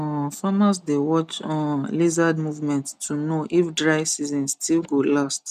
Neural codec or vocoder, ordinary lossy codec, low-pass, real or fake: none; none; 14.4 kHz; real